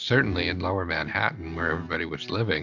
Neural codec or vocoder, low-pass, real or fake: none; 7.2 kHz; real